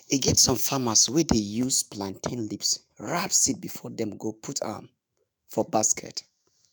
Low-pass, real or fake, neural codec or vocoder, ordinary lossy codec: none; fake; autoencoder, 48 kHz, 128 numbers a frame, DAC-VAE, trained on Japanese speech; none